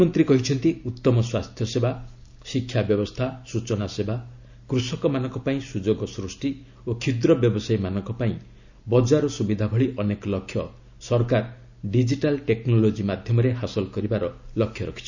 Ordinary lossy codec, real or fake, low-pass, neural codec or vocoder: none; real; 7.2 kHz; none